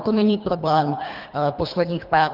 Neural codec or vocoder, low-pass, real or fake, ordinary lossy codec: codec, 24 kHz, 3 kbps, HILCodec; 5.4 kHz; fake; Opus, 24 kbps